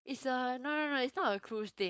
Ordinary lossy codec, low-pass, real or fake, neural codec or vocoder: none; none; fake; codec, 16 kHz, 4.8 kbps, FACodec